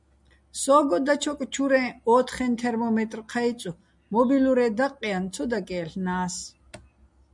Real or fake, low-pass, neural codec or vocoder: real; 10.8 kHz; none